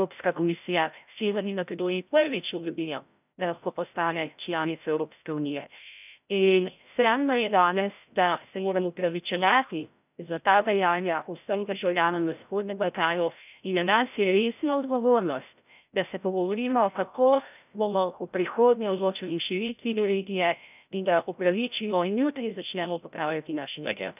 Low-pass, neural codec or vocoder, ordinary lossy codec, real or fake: 3.6 kHz; codec, 16 kHz, 0.5 kbps, FreqCodec, larger model; none; fake